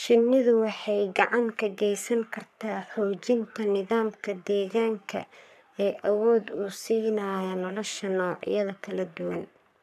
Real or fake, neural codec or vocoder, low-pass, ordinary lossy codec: fake; codec, 44.1 kHz, 3.4 kbps, Pupu-Codec; 14.4 kHz; none